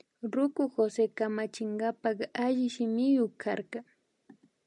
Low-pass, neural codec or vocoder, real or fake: 10.8 kHz; none; real